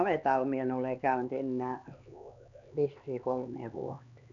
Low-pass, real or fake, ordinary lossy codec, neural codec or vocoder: 7.2 kHz; fake; none; codec, 16 kHz, 4 kbps, X-Codec, HuBERT features, trained on LibriSpeech